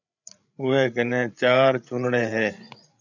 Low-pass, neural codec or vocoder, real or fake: 7.2 kHz; codec, 16 kHz, 8 kbps, FreqCodec, larger model; fake